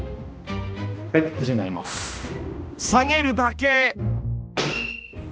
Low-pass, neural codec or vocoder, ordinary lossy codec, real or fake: none; codec, 16 kHz, 1 kbps, X-Codec, HuBERT features, trained on balanced general audio; none; fake